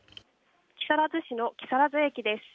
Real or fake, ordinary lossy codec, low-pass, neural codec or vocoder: real; none; none; none